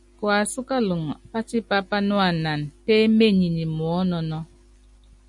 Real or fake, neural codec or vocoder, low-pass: real; none; 10.8 kHz